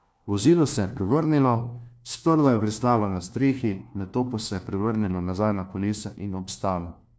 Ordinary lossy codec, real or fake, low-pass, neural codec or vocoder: none; fake; none; codec, 16 kHz, 1 kbps, FunCodec, trained on LibriTTS, 50 frames a second